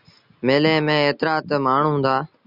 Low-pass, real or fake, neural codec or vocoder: 5.4 kHz; real; none